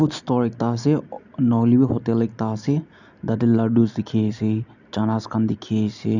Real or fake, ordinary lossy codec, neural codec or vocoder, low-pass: real; none; none; 7.2 kHz